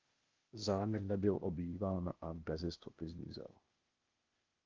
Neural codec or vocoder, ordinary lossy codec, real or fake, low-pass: codec, 16 kHz, 0.8 kbps, ZipCodec; Opus, 24 kbps; fake; 7.2 kHz